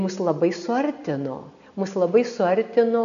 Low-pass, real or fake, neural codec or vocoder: 7.2 kHz; real; none